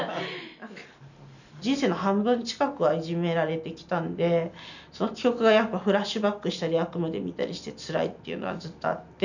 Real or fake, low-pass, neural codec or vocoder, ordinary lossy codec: real; 7.2 kHz; none; none